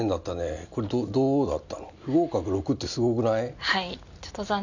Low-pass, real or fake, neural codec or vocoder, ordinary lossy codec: 7.2 kHz; real; none; none